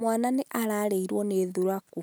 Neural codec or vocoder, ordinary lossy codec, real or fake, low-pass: none; none; real; none